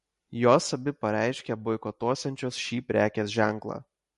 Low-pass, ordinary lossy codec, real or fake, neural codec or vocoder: 14.4 kHz; MP3, 48 kbps; real; none